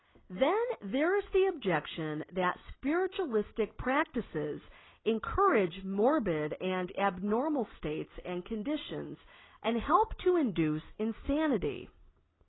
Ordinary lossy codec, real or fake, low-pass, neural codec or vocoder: AAC, 16 kbps; real; 7.2 kHz; none